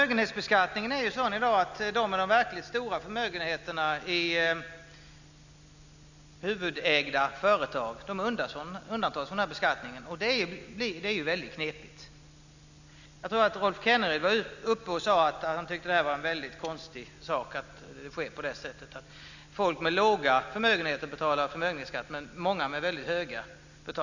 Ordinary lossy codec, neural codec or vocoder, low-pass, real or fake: none; none; 7.2 kHz; real